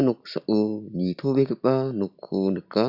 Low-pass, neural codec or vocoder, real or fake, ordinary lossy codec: 5.4 kHz; none; real; none